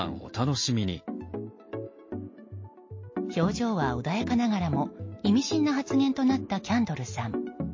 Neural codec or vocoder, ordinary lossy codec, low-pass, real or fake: none; MP3, 32 kbps; 7.2 kHz; real